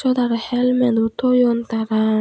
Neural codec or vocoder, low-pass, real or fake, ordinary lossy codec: none; none; real; none